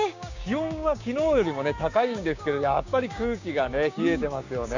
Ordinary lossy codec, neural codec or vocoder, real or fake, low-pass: none; codec, 16 kHz, 6 kbps, DAC; fake; 7.2 kHz